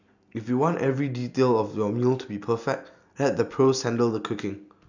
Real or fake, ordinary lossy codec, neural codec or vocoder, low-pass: real; none; none; 7.2 kHz